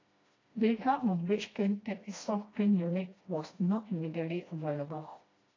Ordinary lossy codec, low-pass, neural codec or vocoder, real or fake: AAC, 32 kbps; 7.2 kHz; codec, 16 kHz, 1 kbps, FreqCodec, smaller model; fake